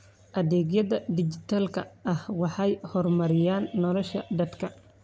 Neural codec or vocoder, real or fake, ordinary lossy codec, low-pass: none; real; none; none